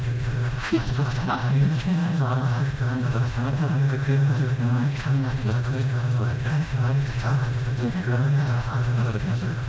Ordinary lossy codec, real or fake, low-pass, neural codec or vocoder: none; fake; none; codec, 16 kHz, 0.5 kbps, FreqCodec, smaller model